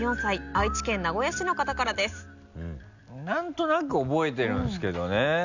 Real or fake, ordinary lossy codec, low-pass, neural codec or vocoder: real; none; 7.2 kHz; none